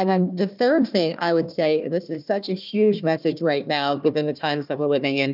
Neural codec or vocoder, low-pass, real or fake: codec, 16 kHz, 1 kbps, FunCodec, trained on Chinese and English, 50 frames a second; 5.4 kHz; fake